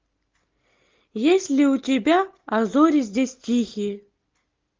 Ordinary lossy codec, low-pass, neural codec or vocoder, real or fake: Opus, 24 kbps; 7.2 kHz; none; real